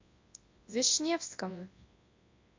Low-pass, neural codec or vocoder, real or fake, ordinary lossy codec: 7.2 kHz; codec, 24 kHz, 0.9 kbps, WavTokenizer, large speech release; fake; MP3, 48 kbps